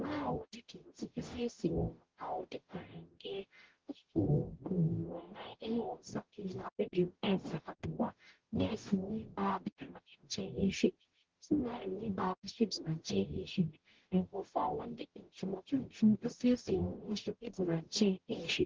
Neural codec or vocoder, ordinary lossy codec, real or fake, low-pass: codec, 44.1 kHz, 0.9 kbps, DAC; Opus, 16 kbps; fake; 7.2 kHz